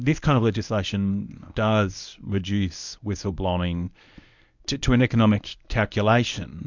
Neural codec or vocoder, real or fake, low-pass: codec, 24 kHz, 0.9 kbps, WavTokenizer, medium speech release version 1; fake; 7.2 kHz